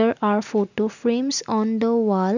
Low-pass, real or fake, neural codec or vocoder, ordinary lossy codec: 7.2 kHz; real; none; none